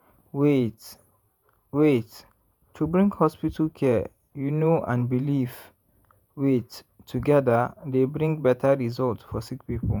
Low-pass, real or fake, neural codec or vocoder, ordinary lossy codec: none; fake; vocoder, 48 kHz, 128 mel bands, Vocos; none